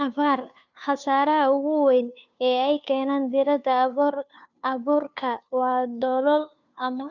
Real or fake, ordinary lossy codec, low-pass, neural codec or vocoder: fake; none; 7.2 kHz; codec, 16 kHz, 2 kbps, FunCodec, trained on Chinese and English, 25 frames a second